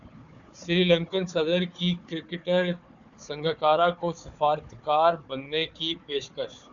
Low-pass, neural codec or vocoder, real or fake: 7.2 kHz; codec, 16 kHz, 4 kbps, FunCodec, trained on Chinese and English, 50 frames a second; fake